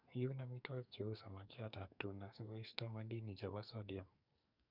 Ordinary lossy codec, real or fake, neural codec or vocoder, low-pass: none; fake; codec, 44.1 kHz, 2.6 kbps, SNAC; 5.4 kHz